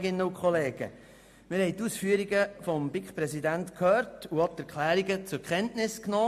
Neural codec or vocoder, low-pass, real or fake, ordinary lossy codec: none; 14.4 kHz; real; MP3, 64 kbps